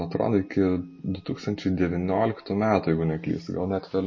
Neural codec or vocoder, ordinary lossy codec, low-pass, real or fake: none; MP3, 32 kbps; 7.2 kHz; real